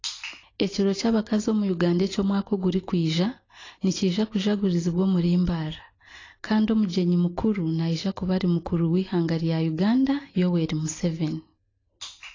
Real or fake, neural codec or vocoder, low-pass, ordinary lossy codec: real; none; 7.2 kHz; AAC, 32 kbps